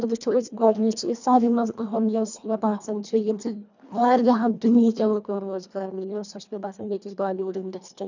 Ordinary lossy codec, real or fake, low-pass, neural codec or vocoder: none; fake; 7.2 kHz; codec, 24 kHz, 1.5 kbps, HILCodec